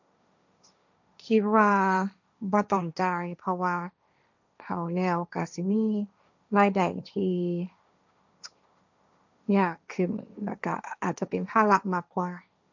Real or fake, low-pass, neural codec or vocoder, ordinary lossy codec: fake; 7.2 kHz; codec, 16 kHz, 1.1 kbps, Voila-Tokenizer; none